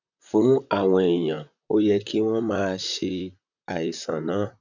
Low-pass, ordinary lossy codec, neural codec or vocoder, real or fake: 7.2 kHz; none; vocoder, 44.1 kHz, 128 mel bands, Pupu-Vocoder; fake